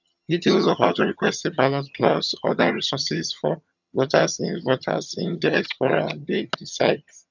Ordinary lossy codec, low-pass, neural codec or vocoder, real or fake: none; 7.2 kHz; vocoder, 22.05 kHz, 80 mel bands, HiFi-GAN; fake